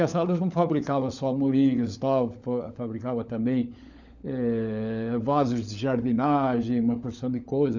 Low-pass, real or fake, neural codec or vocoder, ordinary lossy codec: 7.2 kHz; fake; codec, 16 kHz, 16 kbps, FunCodec, trained on LibriTTS, 50 frames a second; none